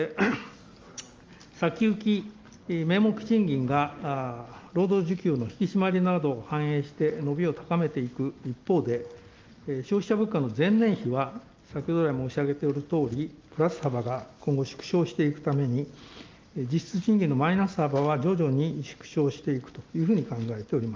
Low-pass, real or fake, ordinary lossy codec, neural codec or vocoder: 7.2 kHz; real; Opus, 32 kbps; none